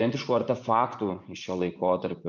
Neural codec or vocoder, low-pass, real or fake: vocoder, 24 kHz, 100 mel bands, Vocos; 7.2 kHz; fake